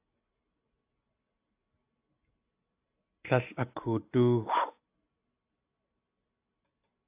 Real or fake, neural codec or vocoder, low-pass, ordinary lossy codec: real; none; 3.6 kHz; AAC, 32 kbps